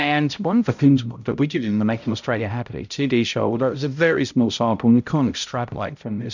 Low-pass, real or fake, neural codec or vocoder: 7.2 kHz; fake; codec, 16 kHz, 0.5 kbps, X-Codec, HuBERT features, trained on balanced general audio